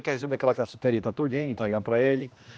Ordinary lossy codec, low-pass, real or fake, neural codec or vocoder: none; none; fake; codec, 16 kHz, 1 kbps, X-Codec, HuBERT features, trained on general audio